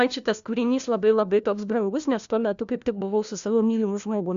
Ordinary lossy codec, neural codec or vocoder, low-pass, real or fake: Opus, 64 kbps; codec, 16 kHz, 1 kbps, FunCodec, trained on LibriTTS, 50 frames a second; 7.2 kHz; fake